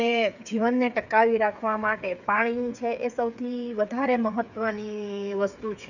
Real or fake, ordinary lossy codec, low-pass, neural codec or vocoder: fake; none; 7.2 kHz; codec, 16 kHz, 8 kbps, FreqCodec, smaller model